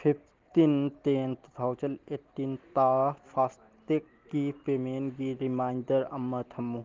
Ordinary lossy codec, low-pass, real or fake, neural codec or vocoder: Opus, 16 kbps; 7.2 kHz; real; none